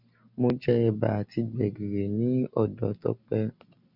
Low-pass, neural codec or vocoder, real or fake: 5.4 kHz; none; real